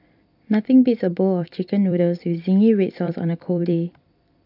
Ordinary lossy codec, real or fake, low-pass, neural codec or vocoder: AAC, 48 kbps; fake; 5.4 kHz; vocoder, 44.1 kHz, 128 mel bands every 256 samples, BigVGAN v2